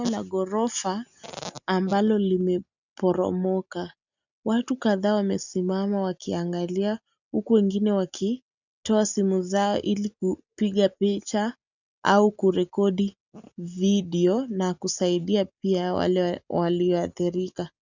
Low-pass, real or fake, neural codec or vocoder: 7.2 kHz; real; none